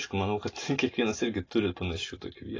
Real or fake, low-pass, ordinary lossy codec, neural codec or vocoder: real; 7.2 kHz; AAC, 32 kbps; none